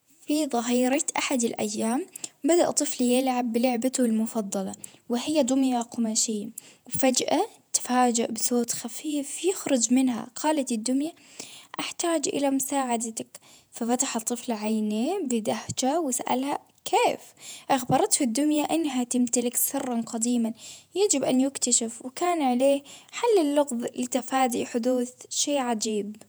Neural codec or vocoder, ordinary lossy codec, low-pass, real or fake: vocoder, 48 kHz, 128 mel bands, Vocos; none; none; fake